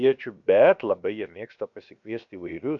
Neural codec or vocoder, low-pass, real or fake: codec, 16 kHz, about 1 kbps, DyCAST, with the encoder's durations; 7.2 kHz; fake